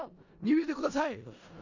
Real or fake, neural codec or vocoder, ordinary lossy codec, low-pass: fake; codec, 16 kHz in and 24 kHz out, 0.4 kbps, LongCat-Audio-Codec, four codebook decoder; none; 7.2 kHz